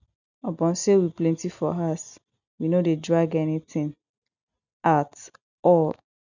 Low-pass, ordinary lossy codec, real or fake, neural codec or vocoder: 7.2 kHz; none; real; none